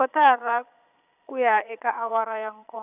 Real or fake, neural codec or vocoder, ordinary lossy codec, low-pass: fake; vocoder, 22.05 kHz, 80 mel bands, Vocos; none; 3.6 kHz